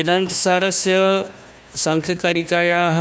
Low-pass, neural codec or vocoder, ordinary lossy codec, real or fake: none; codec, 16 kHz, 1 kbps, FunCodec, trained on Chinese and English, 50 frames a second; none; fake